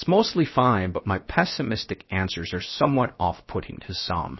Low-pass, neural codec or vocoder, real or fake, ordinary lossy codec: 7.2 kHz; codec, 16 kHz, 0.7 kbps, FocalCodec; fake; MP3, 24 kbps